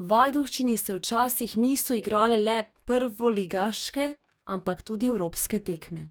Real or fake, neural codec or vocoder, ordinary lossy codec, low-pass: fake; codec, 44.1 kHz, 2.6 kbps, DAC; none; none